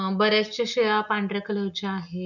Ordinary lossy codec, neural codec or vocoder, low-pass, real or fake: none; none; 7.2 kHz; real